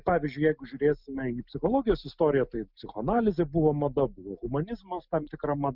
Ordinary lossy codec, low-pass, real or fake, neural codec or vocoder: Opus, 64 kbps; 5.4 kHz; real; none